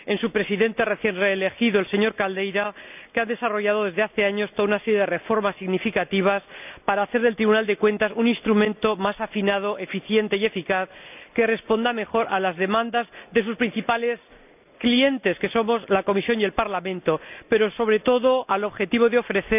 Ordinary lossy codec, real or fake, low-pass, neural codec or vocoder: none; real; 3.6 kHz; none